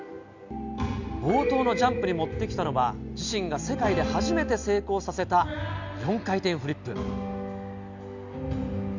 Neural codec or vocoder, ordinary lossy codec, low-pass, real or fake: none; none; 7.2 kHz; real